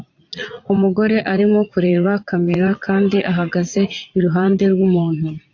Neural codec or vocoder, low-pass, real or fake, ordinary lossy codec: vocoder, 44.1 kHz, 80 mel bands, Vocos; 7.2 kHz; fake; AAC, 48 kbps